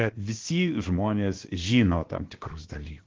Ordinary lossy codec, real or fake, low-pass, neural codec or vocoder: Opus, 16 kbps; fake; 7.2 kHz; codec, 16 kHz, about 1 kbps, DyCAST, with the encoder's durations